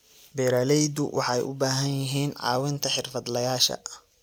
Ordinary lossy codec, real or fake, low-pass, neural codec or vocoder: none; real; none; none